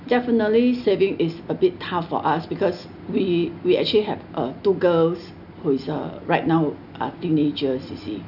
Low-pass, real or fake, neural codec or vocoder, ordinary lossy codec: 5.4 kHz; real; none; none